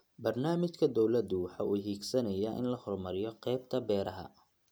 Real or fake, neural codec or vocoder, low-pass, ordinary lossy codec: real; none; none; none